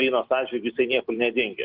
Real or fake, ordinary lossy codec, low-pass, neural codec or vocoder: real; Opus, 32 kbps; 5.4 kHz; none